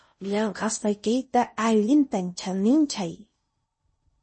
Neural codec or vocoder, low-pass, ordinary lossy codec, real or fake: codec, 16 kHz in and 24 kHz out, 0.6 kbps, FocalCodec, streaming, 4096 codes; 9.9 kHz; MP3, 32 kbps; fake